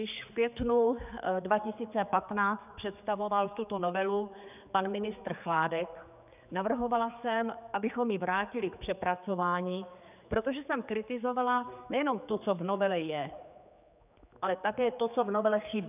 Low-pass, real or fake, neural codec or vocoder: 3.6 kHz; fake; codec, 16 kHz, 4 kbps, X-Codec, HuBERT features, trained on general audio